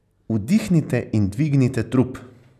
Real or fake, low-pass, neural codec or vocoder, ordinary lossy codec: real; 14.4 kHz; none; none